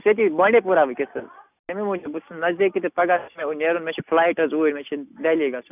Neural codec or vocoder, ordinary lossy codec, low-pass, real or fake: none; none; 3.6 kHz; real